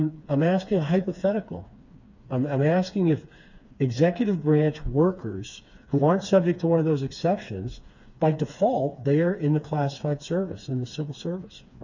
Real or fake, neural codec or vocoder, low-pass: fake; codec, 16 kHz, 4 kbps, FreqCodec, smaller model; 7.2 kHz